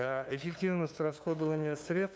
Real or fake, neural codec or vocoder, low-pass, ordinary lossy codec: fake; codec, 16 kHz, 2 kbps, FunCodec, trained on LibriTTS, 25 frames a second; none; none